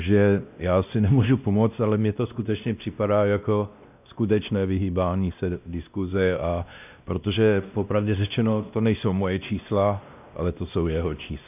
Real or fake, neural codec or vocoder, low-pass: fake; codec, 16 kHz, 1 kbps, X-Codec, WavLM features, trained on Multilingual LibriSpeech; 3.6 kHz